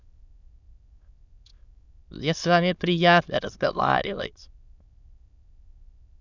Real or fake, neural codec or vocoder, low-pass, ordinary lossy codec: fake; autoencoder, 22.05 kHz, a latent of 192 numbers a frame, VITS, trained on many speakers; 7.2 kHz; none